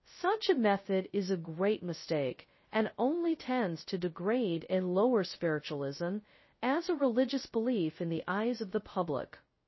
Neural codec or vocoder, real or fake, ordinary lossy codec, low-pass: codec, 16 kHz, 0.2 kbps, FocalCodec; fake; MP3, 24 kbps; 7.2 kHz